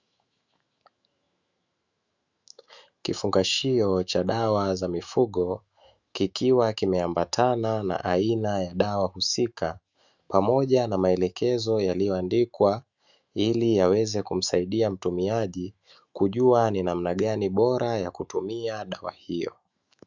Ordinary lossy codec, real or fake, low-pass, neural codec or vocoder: Opus, 64 kbps; fake; 7.2 kHz; autoencoder, 48 kHz, 128 numbers a frame, DAC-VAE, trained on Japanese speech